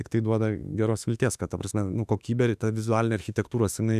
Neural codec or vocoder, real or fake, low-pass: autoencoder, 48 kHz, 32 numbers a frame, DAC-VAE, trained on Japanese speech; fake; 14.4 kHz